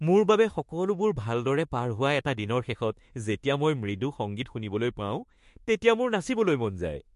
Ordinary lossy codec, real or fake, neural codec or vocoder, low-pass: MP3, 48 kbps; fake; autoencoder, 48 kHz, 32 numbers a frame, DAC-VAE, trained on Japanese speech; 14.4 kHz